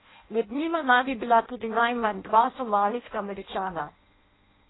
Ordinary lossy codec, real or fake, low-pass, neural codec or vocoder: AAC, 16 kbps; fake; 7.2 kHz; codec, 16 kHz in and 24 kHz out, 0.6 kbps, FireRedTTS-2 codec